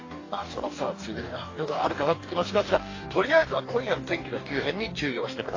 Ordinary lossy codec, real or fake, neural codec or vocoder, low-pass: AAC, 32 kbps; fake; codec, 44.1 kHz, 2.6 kbps, DAC; 7.2 kHz